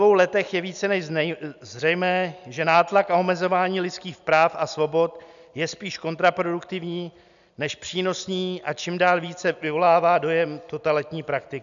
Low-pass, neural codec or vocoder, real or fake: 7.2 kHz; none; real